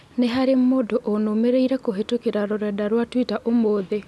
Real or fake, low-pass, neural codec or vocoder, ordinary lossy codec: real; none; none; none